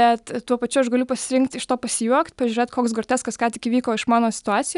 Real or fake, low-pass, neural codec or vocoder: real; 10.8 kHz; none